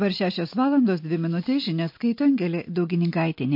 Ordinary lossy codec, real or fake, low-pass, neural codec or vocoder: MP3, 32 kbps; real; 5.4 kHz; none